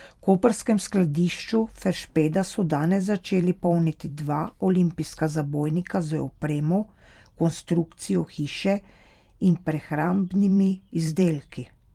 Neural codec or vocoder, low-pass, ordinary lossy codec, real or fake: vocoder, 44.1 kHz, 128 mel bands every 512 samples, BigVGAN v2; 19.8 kHz; Opus, 32 kbps; fake